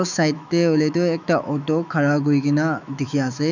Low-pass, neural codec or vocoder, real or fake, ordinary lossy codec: 7.2 kHz; autoencoder, 48 kHz, 128 numbers a frame, DAC-VAE, trained on Japanese speech; fake; none